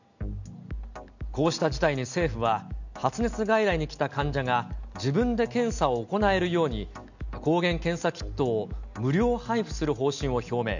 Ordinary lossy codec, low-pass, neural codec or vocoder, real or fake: none; 7.2 kHz; none; real